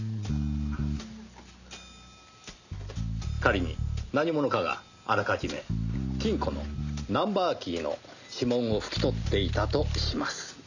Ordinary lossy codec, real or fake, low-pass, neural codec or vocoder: none; real; 7.2 kHz; none